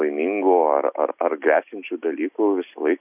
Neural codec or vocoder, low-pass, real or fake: none; 3.6 kHz; real